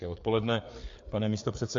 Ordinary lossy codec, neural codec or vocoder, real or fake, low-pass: MP3, 48 kbps; codec, 16 kHz, 4 kbps, FreqCodec, larger model; fake; 7.2 kHz